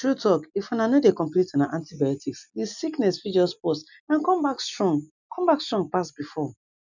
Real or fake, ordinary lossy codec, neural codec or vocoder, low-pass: real; none; none; 7.2 kHz